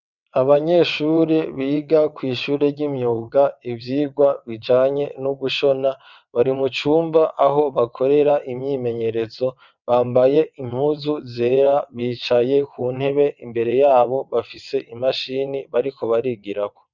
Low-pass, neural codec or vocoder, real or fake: 7.2 kHz; vocoder, 22.05 kHz, 80 mel bands, WaveNeXt; fake